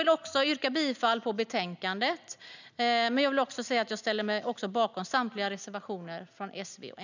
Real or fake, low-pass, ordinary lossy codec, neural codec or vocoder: real; 7.2 kHz; none; none